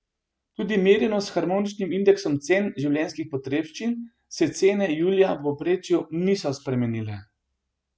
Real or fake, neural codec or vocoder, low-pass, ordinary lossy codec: real; none; none; none